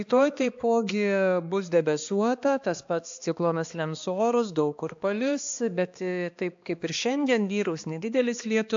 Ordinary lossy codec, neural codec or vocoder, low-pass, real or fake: AAC, 64 kbps; codec, 16 kHz, 2 kbps, X-Codec, HuBERT features, trained on balanced general audio; 7.2 kHz; fake